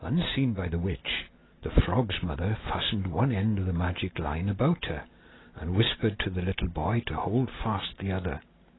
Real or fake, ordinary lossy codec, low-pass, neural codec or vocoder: real; AAC, 16 kbps; 7.2 kHz; none